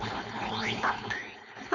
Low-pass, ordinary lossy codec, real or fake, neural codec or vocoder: 7.2 kHz; none; fake; codec, 16 kHz, 4.8 kbps, FACodec